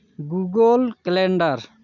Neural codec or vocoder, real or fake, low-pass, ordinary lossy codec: none; real; 7.2 kHz; none